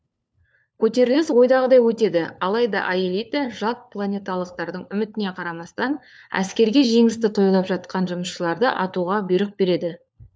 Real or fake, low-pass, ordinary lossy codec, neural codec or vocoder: fake; none; none; codec, 16 kHz, 4 kbps, FunCodec, trained on LibriTTS, 50 frames a second